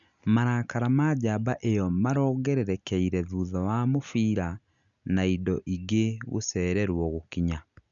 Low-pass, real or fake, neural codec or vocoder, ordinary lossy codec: 7.2 kHz; real; none; none